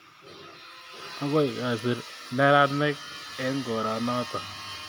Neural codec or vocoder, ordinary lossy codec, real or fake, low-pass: none; none; real; 19.8 kHz